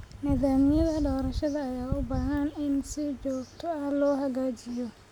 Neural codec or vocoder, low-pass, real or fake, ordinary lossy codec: none; 19.8 kHz; real; none